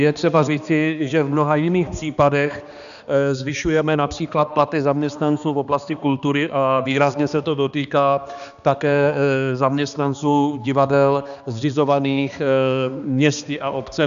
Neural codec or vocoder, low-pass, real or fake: codec, 16 kHz, 2 kbps, X-Codec, HuBERT features, trained on balanced general audio; 7.2 kHz; fake